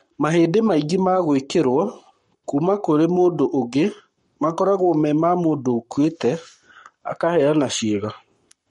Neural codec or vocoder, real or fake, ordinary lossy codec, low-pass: codec, 44.1 kHz, 7.8 kbps, Pupu-Codec; fake; MP3, 48 kbps; 19.8 kHz